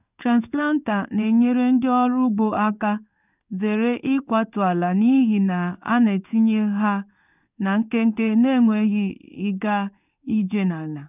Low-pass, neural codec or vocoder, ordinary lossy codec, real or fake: 3.6 kHz; codec, 16 kHz in and 24 kHz out, 1 kbps, XY-Tokenizer; none; fake